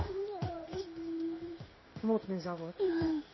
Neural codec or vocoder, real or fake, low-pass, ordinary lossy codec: codec, 16 kHz in and 24 kHz out, 1 kbps, XY-Tokenizer; fake; 7.2 kHz; MP3, 24 kbps